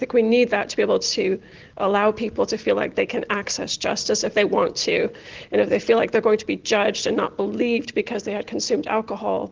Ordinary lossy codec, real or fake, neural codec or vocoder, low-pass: Opus, 16 kbps; real; none; 7.2 kHz